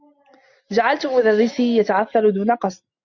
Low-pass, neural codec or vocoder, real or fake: 7.2 kHz; none; real